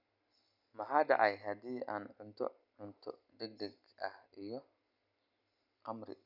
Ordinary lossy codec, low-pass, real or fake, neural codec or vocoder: none; 5.4 kHz; real; none